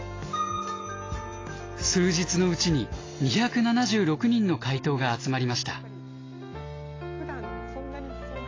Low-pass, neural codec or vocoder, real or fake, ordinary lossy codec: 7.2 kHz; none; real; AAC, 32 kbps